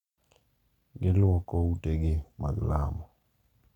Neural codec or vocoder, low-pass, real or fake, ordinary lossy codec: none; 19.8 kHz; real; none